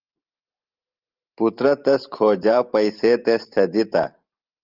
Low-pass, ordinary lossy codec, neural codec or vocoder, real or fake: 5.4 kHz; Opus, 24 kbps; vocoder, 44.1 kHz, 128 mel bands every 512 samples, BigVGAN v2; fake